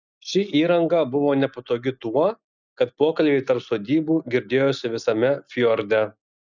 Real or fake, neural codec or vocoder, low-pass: real; none; 7.2 kHz